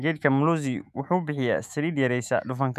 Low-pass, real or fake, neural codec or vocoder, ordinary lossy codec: 14.4 kHz; fake; autoencoder, 48 kHz, 128 numbers a frame, DAC-VAE, trained on Japanese speech; none